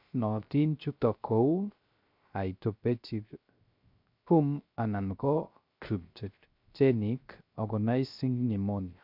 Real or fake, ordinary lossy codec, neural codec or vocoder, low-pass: fake; MP3, 48 kbps; codec, 16 kHz, 0.3 kbps, FocalCodec; 5.4 kHz